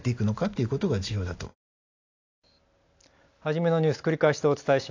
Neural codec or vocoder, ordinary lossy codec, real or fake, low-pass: none; AAC, 48 kbps; real; 7.2 kHz